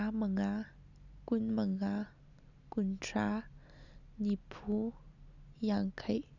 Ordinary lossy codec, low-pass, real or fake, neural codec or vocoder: none; 7.2 kHz; real; none